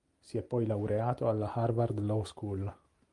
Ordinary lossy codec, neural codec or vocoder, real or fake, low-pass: Opus, 32 kbps; none; real; 10.8 kHz